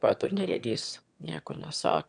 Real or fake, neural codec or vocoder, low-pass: fake; autoencoder, 22.05 kHz, a latent of 192 numbers a frame, VITS, trained on one speaker; 9.9 kHz